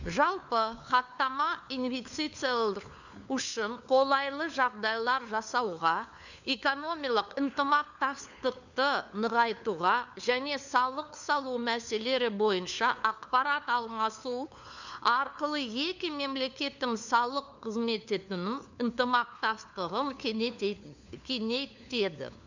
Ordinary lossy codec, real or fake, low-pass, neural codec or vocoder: none; fake; 7.2 kHz; codec, 16 kHz, 4 kbps, FunCodec, trained on LibriTTS, 50 frames a second